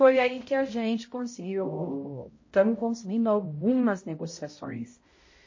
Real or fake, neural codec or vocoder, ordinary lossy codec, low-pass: fake; codec, 16 kHz, 0.5 kbps, X-Codec, HuBERT features, trained on balanced general audio; MP3, 32 kbps; 7.2 kHz